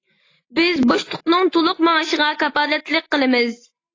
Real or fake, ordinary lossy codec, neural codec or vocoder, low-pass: real; AAC, 32 kbps; none; 7.2 kHz